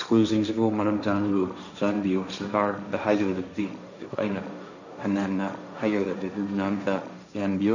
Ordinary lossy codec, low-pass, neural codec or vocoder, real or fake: none; 7.2 kHz; codec, 16 kHz, 1.1 kbps, Voila-Tokenizer; fake